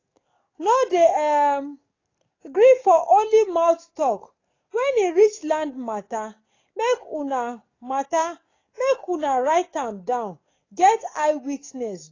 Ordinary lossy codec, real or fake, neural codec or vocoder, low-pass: AAC, 32 kbps; fake; codec, 44.1 kHz, 7.8 kbps, DAC; 7.2 kHz